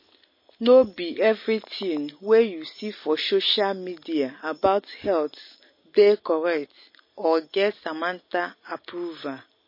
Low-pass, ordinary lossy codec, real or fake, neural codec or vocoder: 5.4 kHz; MP3, 24 kbps; real; none